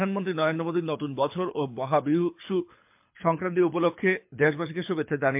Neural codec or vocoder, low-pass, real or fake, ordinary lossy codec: codec, 24 kHz, 6 kbps, HILCodec; 3.6 kHz; fake; MP3, 32 kbps